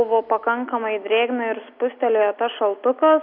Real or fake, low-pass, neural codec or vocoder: real; 5.4 kHz; none